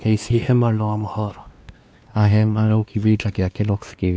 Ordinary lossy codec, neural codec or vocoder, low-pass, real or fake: none; codec, 16 kHz, 2 kbps, X-Codec, WavLM features, trained on Multilingual LibriSpeech; none; fake